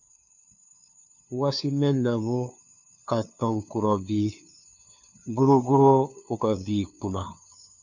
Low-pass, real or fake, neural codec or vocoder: 7.2 kHz; fake; codec, 16 kHz, 2 kbps, FunCodec, trained on LibriTTS, 25 frames a second